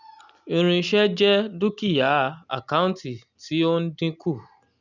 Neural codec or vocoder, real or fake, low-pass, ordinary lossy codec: none; real; 7.2 kHz; none